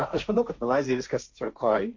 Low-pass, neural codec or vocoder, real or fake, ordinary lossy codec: 7.2 kHz; codec, 16 kHz, 1.1 kbps, Voila-Tokenizer; fake; MP3, 48 kbps